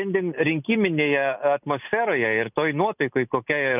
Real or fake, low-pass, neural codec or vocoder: real; 3.6 kHz; none